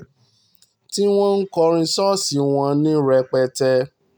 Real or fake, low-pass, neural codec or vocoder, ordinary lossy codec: real; none; none; none